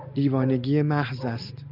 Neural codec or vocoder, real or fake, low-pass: none; real; 5.4 kHz